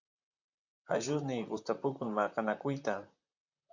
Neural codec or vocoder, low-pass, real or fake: vocoder, 44.1 kHz, 128 mel bands, Pupu-Vocoder; 7.2 kHz; fake